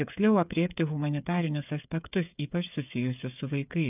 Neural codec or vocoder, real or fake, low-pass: codec, 16 kHz, 8 kbps, FreqCodec, smaller model; fake; 3.6 kHz